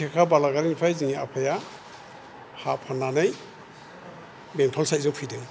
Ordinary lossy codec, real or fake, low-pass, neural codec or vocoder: none; real; none; none